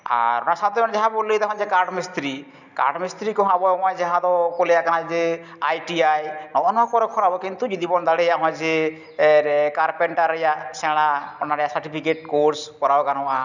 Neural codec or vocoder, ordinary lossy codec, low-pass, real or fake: none; none; 7.2 kHz; real